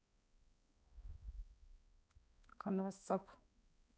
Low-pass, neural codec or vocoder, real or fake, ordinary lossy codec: none; codec, 16 kHz, 2 kbps, X-Codec, HuBERT features, trained on balanced general audio; fake; none